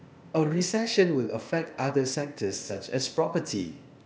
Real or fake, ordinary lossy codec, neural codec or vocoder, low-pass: fake; none; codec, 16 kHz, 0.8 kbps, ZipCodec; none